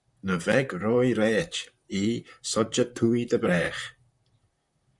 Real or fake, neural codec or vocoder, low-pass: fake; vocoder, 44.1 kHz, 128 mel bands, Pupu-Vocoder; 10.8 kHz